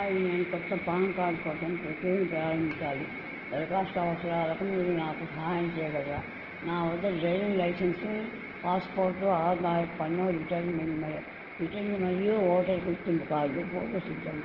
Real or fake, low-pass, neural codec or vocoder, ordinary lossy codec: real; 5.4 kHz; none; Opus, 16 kbps